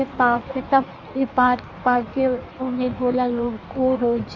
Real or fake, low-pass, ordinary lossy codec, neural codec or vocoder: fake; 7.2 kHz; none; codec, 16 kHz in and 24 kHz out, 1.1 kbps, FireRedTTS-2 codec